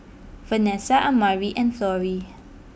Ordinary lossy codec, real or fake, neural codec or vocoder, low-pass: none; real; none; none